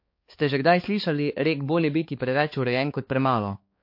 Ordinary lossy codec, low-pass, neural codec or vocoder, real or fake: MP3, 32 kbps; 5.4 kHz; codec, 16 kHz, 4 kbps, X-Codec, HuBERT features, trained on balanced general audio; fake